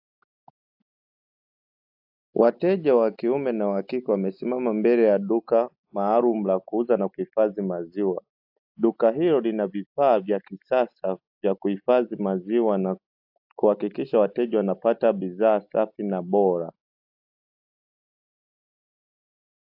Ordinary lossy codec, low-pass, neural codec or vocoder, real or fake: AAC, 48 kbps; 5.4 kHz; none; real